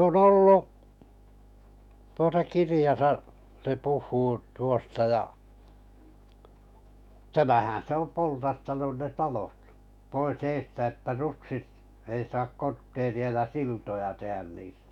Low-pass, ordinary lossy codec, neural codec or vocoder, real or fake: 19.8 kHz; none; autoencoder, 48 kHz, 128 numbers a frame, DAC-VAE, trained on Japanese speech; fake